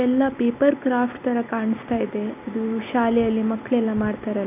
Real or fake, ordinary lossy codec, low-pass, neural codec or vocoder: real; none; 3.6 kHz; none